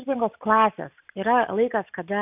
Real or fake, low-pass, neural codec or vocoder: real; 3.6 kHz; none